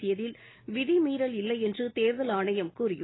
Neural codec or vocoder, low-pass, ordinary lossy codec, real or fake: none; 7.2 kHz; AAC, 16 kbps; real